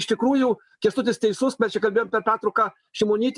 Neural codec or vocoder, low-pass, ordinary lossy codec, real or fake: none; 10.8 kHz; MP3, 96 kbps; real